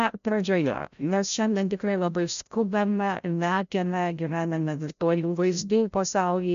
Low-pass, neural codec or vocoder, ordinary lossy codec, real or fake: 7.2 kHz; codec, 16 kHz, 0.5 kbps, FreqCodec, larger model; MP3, 64 kbps; fake